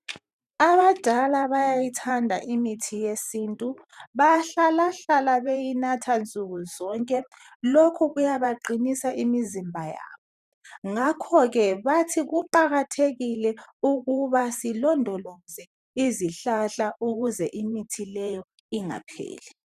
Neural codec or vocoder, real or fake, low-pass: vocoder, 44.1 kHz, 128 mel bands every 512 samples, BigVGAN v2; fake; 14.4 kHz